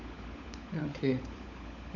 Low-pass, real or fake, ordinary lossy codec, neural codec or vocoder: 7.2 kHz; fake; none; codec, 16 kHz, 16 kbps, FunCodec, trained on LibriTTS, 50 frames a second